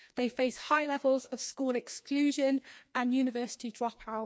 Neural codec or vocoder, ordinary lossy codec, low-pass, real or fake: codec, 16 kHz, 2 kbps, FreqCodec, larger model; none; none; fake